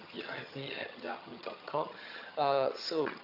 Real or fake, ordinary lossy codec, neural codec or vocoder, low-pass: fake; AAC, 48 kbps; vocoder, 22.05 kHz, 80 mel bands, HiFi-GAN; 5.4 kHz